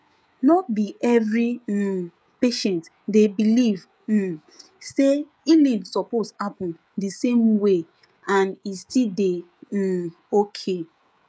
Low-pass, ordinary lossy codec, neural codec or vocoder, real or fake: none; none; codec, 16 kHz, 16 kbps, FreqCodec, smaller model; fake